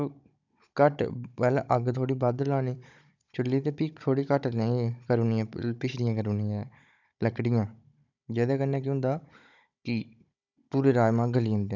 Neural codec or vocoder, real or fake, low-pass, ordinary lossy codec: codec, 16 kHz, 16 kbps, FunCodec, trained on Chinese and English, 50 frames a second; fake; 7.2 kHz; none